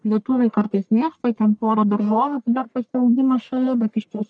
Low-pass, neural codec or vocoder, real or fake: 9.9 kHz; codec, 44.1 kHz, 1.7 kbps, Pupu-Codec; fake